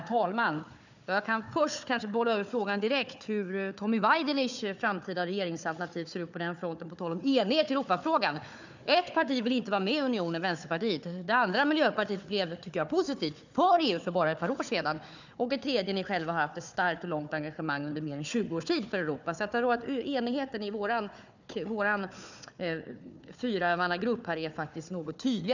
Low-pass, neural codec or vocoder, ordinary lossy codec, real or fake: 7.2 kHz; codec, 16 kHz, 4 kbps, FunCodec, trained on Chinese and English, 50 frames a second; none; fake